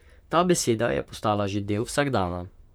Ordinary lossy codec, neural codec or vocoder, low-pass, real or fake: none; vocoder, 44.1 kHz, 128 mel bands, Pupu-Vocoder; none; fake